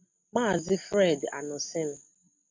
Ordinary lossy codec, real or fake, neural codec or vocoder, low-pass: MP3, 48 kbps; real; none; 7.2 kHz